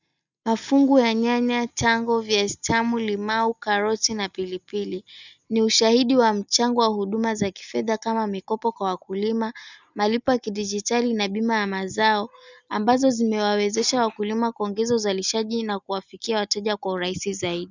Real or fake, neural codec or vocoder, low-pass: real; none; 7.2 kHz